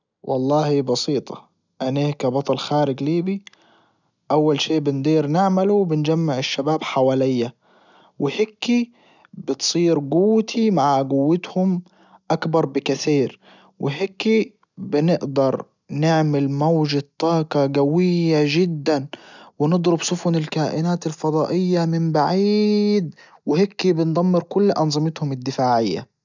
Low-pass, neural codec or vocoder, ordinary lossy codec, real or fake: 7.2 kHz; none; none; real